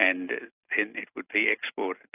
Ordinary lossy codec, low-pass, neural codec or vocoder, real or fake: AAC, 32 kbps; 3.6 kHz; none; real